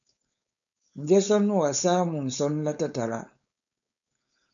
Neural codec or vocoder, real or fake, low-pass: codec, 16 kHz, 4.8 kbps, FACodec; fake; 7.2 kHz